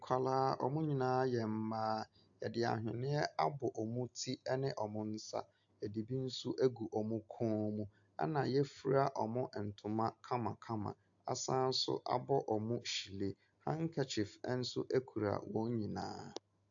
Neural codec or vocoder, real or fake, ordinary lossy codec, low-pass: none; real; AAC, 64 kbps; 7.2 kHz